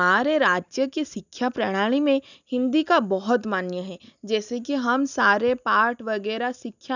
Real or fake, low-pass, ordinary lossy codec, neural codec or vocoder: real; 7.2 kHz; none; none